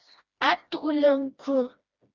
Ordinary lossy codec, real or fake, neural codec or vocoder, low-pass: Opus, 64 kbps; fake; codec, 16 kHz, 1 kbps, FreqCodec, smaller model; 7.2 kHz